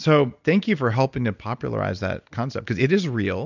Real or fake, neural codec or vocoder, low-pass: real; none; 7.2 kHz